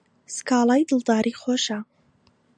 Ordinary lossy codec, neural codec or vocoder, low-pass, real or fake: MP3, 96 kbps; none; 9.9 kHz; real